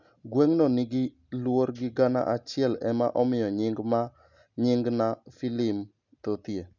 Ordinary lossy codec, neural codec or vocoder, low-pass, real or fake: none; none; 7.2 kHz; real